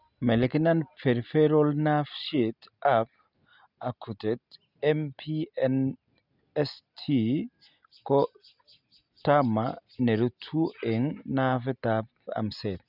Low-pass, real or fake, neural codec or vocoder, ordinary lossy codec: 5.4 kHz; real; none; none